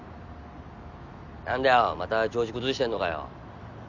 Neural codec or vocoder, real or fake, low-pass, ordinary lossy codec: none; real; 7.2 kHz; none